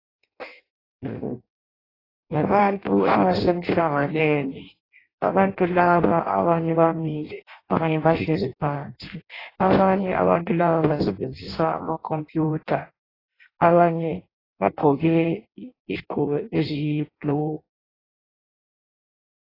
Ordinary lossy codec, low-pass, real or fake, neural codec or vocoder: AAC, 24 kbps; 5.4 kHz; fake; codec, 16 kHz in and 24 kHz out, 0.6 kbps, FireRedTTS-2 codec